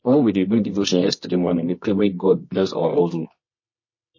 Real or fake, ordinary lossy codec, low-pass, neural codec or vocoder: fake; MP3, 32 kbps; 7.2 kHz; codec, 24 kHz, 0.9 kbps, WavTokenizer, medium music audio release